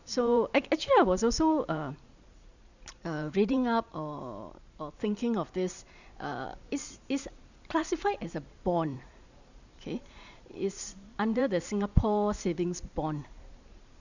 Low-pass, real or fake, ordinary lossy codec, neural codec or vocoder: 7.2 kHz; fake; none; vocoder, 44.1 kHz, 128 mel bands every 512 samples, BigVGAN v2